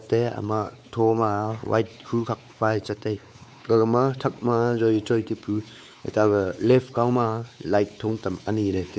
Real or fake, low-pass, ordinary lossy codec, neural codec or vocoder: fake; none; none; codec, 16 kHz, 4 kbps, X-Codec, WavLM features, trained on Multilingual LibriSpeech